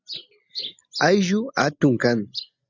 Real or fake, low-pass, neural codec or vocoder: real; 7.2 kHz; none